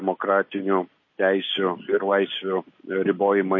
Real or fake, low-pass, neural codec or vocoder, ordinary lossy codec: real; 7.2 kHz; none; MP3, 32 kbps